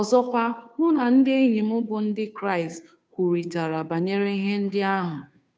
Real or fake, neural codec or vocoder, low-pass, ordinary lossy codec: fake; codec, 16 kHz, 2 kbps, FunCodec, trained on Chinese and English, 25 frames a second; none; none